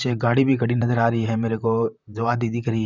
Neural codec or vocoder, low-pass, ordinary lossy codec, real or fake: vocoder, 44.1 kHz, 128 mel bands, Pupu-Vocoder; 7.2 kHz; none; fake